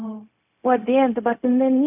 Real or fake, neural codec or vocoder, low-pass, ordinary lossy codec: fake; codec, 16 kHz, 0.4 kbps, LongCat-Audio-Codec; 3.6 kHz; none